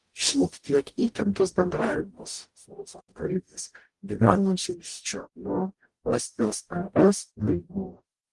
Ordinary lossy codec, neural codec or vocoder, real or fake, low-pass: Opus, 24 kbps; codec, 44.1 kHz, 0.9 kbps, DAC; fake; 10.8 kHz